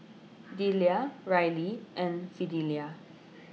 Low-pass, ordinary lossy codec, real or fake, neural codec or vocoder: none; none; real; none